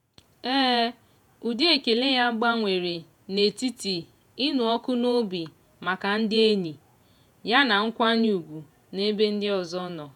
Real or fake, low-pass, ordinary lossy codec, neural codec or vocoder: fake; 19.8 kHz; none; vocoder, 48 kHz, 128 mel bands, Vocos